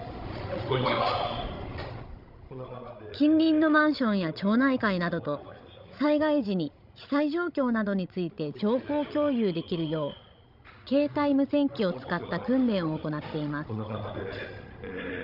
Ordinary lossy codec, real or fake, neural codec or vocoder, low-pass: none; fake; codec, 16 kHz, 16 kbps, FreqCodec, larger model; 5.4 kHz